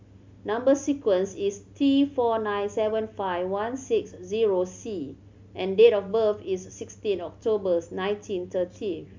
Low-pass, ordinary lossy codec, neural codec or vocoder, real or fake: 7.2 kHz; MP3, 64 kbps; none; real